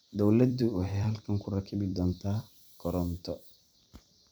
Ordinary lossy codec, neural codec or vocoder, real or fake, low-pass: none; vocoder, 44.1 kHz, 128 mel bands every 256 samples, BigVGAN v2; fake; none